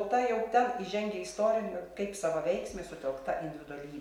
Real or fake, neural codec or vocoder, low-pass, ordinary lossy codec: real; none; 19.8 kHz; MP3, 96 kbps